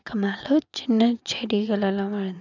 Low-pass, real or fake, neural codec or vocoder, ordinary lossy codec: 7.2 kHz; fake; vocoder, 22.05 kHz, 80 mel bands, WaveNeXt; none